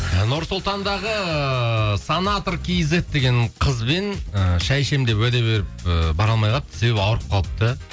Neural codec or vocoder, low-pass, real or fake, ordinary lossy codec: none; none; real; none